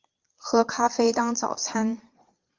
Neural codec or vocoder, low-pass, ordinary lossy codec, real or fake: vocoder, 22.05 kHz, 80 mel bands, Vocos; 7.2 kHz; Opus, 24 kbps; fake